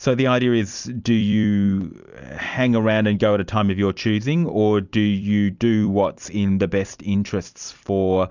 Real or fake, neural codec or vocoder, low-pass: fake; vocoder, 44.1 kHz, 128 mel bands every 256 samples, BigVGAN v2; 7.2 kHz